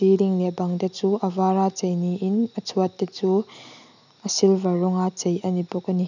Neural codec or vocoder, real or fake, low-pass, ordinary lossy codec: none; real; 7.2 kHz; none